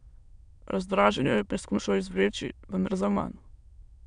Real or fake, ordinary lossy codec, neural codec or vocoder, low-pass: fake; MP3, 96 kbps; autoencoder, 22.05 kHz, a latent of 192 numbers a frame, VITS, trained on many speakers; 9.9 kHz